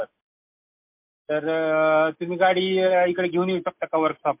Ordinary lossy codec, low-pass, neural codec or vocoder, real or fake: MP3, 32 kbps; 3.6 kHz; none; real